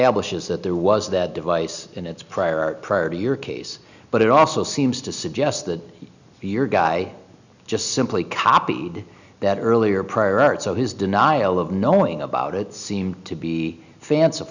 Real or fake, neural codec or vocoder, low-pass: real; none; 7.2 kHz